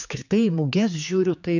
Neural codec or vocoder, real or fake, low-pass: codec, 16 kHz, 4 kbps, X-Codec, HuBERT features, trained on general audio; fake; 7.2 kHz